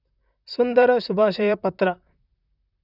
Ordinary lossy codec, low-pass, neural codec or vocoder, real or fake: none; 5.4 kHz; vocoder, 44.1 kHz, 128 mel bands, Pupu-Vocoder; fake